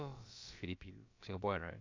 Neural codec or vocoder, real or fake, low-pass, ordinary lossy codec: codec, 16 kHz, about 1 kbps, DyCAST, with the encoder's durations; fake; 7.2 kHz; AAC, 48 kbps